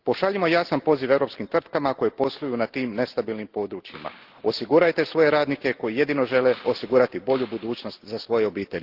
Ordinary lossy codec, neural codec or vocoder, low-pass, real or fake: Opus, 16 kbps; none; 5.4 kHz; real